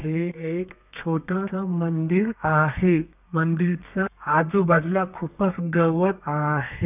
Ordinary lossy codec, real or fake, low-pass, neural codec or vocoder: none; fake; 3.6 kHz; codec, 32 kHz, 1.9 kbps, SNAC